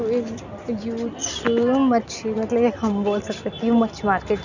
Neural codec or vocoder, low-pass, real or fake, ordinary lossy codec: none; 7.2 kHz; real; none